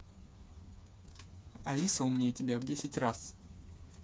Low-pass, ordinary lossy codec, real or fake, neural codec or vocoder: none; none; fake; codec, 16 kHz, 4 kbps, FreqCodec, smaller model